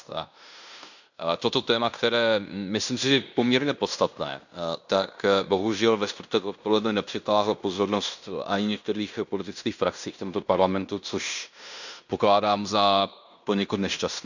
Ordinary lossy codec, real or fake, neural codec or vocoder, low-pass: none; fake; codec, 16 kHz in and 24 kHz out, 0.9 kbps, LongCat-Audio-Codec, fine tuned four codebook decoder; 7.2 kHz